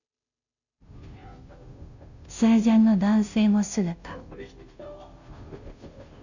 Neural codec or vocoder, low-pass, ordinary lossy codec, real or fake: codec, 16 kHz, 0.5 kbps, FunCodec, trained on Chinese and English, 25 frames a second; 7.2 kHz; none; fake